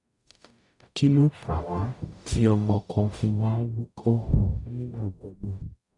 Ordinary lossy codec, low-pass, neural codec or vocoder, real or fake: none; 10.8 kHz; codec, 44.1 kHz, 0.9 kbps, DAC; fake